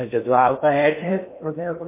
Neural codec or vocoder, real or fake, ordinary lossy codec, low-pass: codec, 16 kHz in and 24 kHz out, 0.8 kbps, FocalCodec, streaming, 65536 codes; fake; MP3, 24 kbps; 3.6 kHz